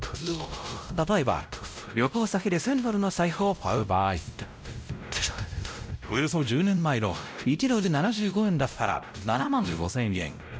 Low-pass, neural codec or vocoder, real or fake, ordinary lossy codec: none; codec, 16 kHz, 0.5 kbps, X-Codec, WavLM features, trained on Multilingual LibriSpeech; fake; none